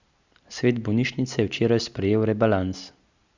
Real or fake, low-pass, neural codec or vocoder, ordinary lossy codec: real; 7.2 kHz; none; Opus, 64 kbps